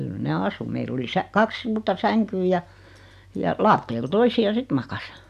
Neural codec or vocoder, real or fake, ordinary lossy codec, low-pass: none; real; none; 14.4 kHz